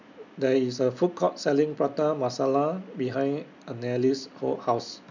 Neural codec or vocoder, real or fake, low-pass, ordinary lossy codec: none; real; 7.2 kHz; none